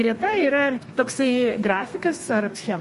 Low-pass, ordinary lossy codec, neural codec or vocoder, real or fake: 14.4 kHz; MP3, 48 kbps; codec, 32 kHz, 1.9 kbps, SNAC; fake